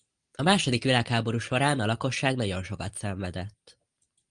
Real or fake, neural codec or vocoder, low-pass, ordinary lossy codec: real; none; 9.9 kHz; Opus, 32 kbps